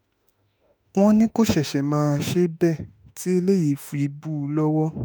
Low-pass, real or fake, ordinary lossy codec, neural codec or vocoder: none; fake; none; autoencoder, 48 kHz, 32 numbers a frame, DAC-VAE, trained on Japanese speech